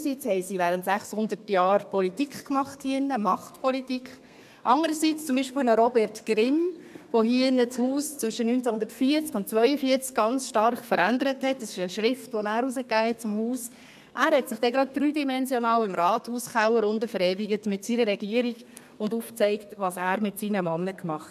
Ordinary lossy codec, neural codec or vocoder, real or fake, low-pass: MP3, 96 kbps; codec, 32 kHz, 1.9 kbps, SNAC; fake; 14.4 kHz